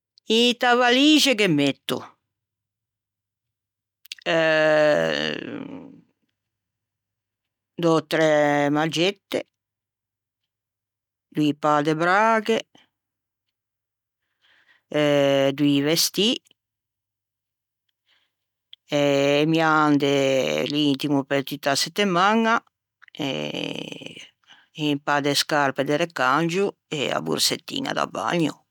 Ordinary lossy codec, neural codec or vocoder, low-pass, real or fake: none; none; 19.8 kHz; real